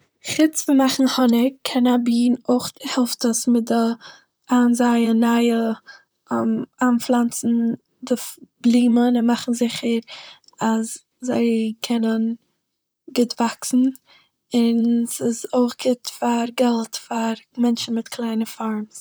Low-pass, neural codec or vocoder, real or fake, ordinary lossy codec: none; vocoder, 44.1 kHz, 128 mel bands, Pupu-Vocoder; fake; none